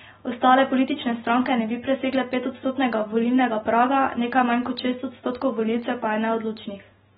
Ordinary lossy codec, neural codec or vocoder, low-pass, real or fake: AAC, 16 kbps; none; 19.8 kHz; real